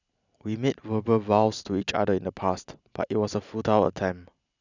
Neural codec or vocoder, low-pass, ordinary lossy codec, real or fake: none; 7.2 kHz; none; real